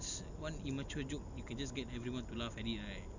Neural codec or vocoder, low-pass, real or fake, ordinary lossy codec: none; 7.2 kHz; real; none